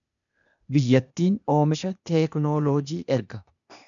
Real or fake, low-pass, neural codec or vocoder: fake; 7.2 kHz; codec, 16 kHz, 0.8 kbps, ZipCodec